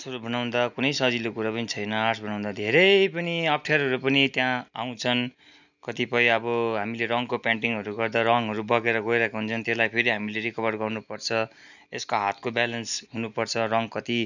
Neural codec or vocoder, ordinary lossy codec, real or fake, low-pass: none; none; real; 7.2 kHz